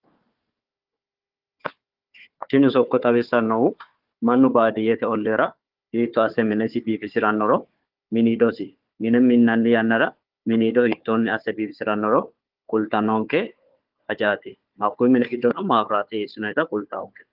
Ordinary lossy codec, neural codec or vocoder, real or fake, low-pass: Opus, 32 kbps; codec, 16 kHz, 4 kbps, FunCodec, trained on Chinese and English, 50 frames a second; fake; 5.4 kHz